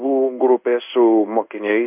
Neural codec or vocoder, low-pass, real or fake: codec, 16 kHz in and 24 kHz out, 1 kbps, XY-Tokenizer; 3.6 kHz; fake